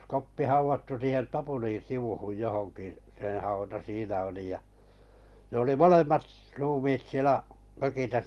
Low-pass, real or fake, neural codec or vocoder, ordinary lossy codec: 14.4 kHz; fake; vocoder, 44.1 kHz, 128 mel bands every 256 samples, BigVGAN v2; Opus, 24 kbps